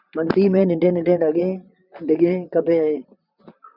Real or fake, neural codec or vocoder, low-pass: fake; vocoder, 44.1 kHz, 128 mel bands, Pupu-Vocoder; 5.4 kHz